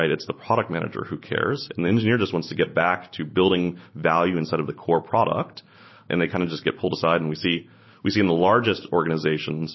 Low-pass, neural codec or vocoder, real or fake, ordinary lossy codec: 7.2 kHz; none; real; MP3, 24 kbps